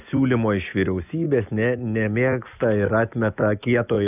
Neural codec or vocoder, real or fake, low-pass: none; real; 3.6 kHz